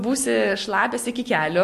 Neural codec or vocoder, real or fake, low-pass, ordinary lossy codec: none; real; 14.4 kHz; MP3, 64 kbps